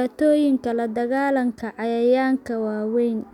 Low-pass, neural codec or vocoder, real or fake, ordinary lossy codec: 19.8 kHz; none; real; none